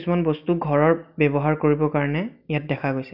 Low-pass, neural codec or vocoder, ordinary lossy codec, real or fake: 5.4 kHz; none; none; real